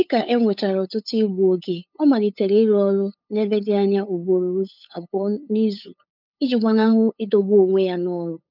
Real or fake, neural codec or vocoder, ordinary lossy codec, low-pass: fake; codec, 16 kHz, 8 kbps, FunCodec, trained on LibriTTS, 25 frames a second; none; 5.4 kHz